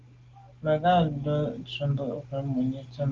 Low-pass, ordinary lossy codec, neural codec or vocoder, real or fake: 7.2 kHz; Opus, 16 kbps; none; real